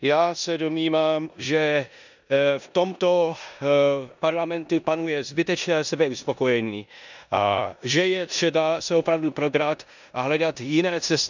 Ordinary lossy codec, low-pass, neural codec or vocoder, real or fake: none; 7.2 kHz; codec, 16 kHz in and 24 kHz out, 0.9 kbps, LongCat-Audio-Codec, four codebook decoder; fake